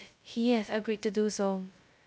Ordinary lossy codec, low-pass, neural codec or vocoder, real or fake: none; none; codec, 16 kHz, 0.2 kbps, FocalCodec; fake